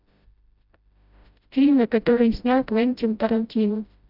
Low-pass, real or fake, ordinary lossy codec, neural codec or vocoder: 5.4 kHz; fake; none; codec, 16 kHz, 0.5 kbps, FreqCodec, smaller model